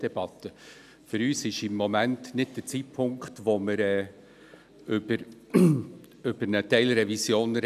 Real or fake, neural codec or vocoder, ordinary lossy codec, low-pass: real; none; none; 14.4 kHz